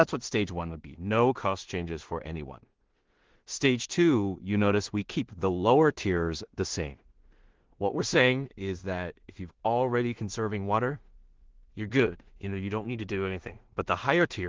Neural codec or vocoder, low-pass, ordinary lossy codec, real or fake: codec, 16 kHz in and 24 kHz out, 0.4 kbps, LongCat-Audio-Codec, two codebook decoder; 7.2 kHz; Opus, 16 kbps; fake